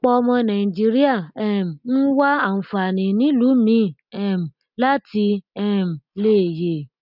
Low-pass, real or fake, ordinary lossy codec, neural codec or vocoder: 5.4 kHz; real; none; none